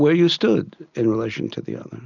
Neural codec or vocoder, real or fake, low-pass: none; real; 7.2 kHz